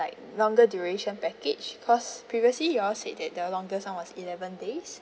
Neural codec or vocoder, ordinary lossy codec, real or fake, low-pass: none; none; real; none